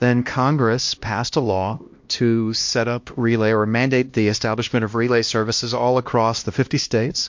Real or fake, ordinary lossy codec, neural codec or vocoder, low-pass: fake; MP3, 48 kbps; codec, 16 kHz, 1 kbps, X-Codec, HuBERT features, trained on LibriSpeech; 7.2 kHz